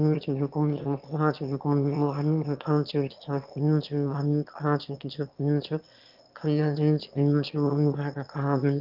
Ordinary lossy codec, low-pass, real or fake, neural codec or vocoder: Opus, 24 kbps; 5.4 kHz; fake; autoencoder, 22.05 kHz, a latent of 192 numbers a frame, VITS, trained on one speaker